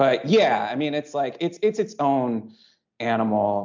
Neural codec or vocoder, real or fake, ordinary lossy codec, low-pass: none; real; MP3, 64 kbps; 7.2 kHz